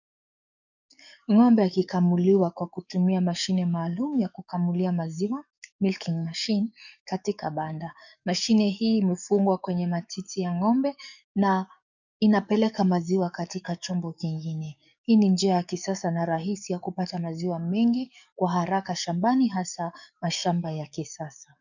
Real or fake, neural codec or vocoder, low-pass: fake; codec, 44.1 kHz, 7.8 kbps, DAC; 7.2 kHz